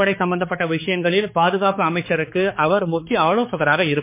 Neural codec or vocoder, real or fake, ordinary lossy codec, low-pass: codec, 16 kHz, 4 kbps, X-Codec, HuBERT features, trained on balanced general audio; fake; MP3, 24 kbps; 3.6 kHz